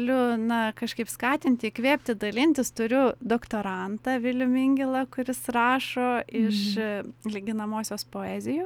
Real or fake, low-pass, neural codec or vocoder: real; 19.8 kHz; none